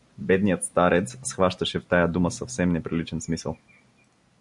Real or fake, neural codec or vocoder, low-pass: real; none; 10.8 kHz